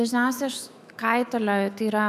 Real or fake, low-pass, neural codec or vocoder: fake; 14.4 kHz; autoencoder, 48 kHz, 128 numbers a frame, DAC-VAE, trained on Japanese speech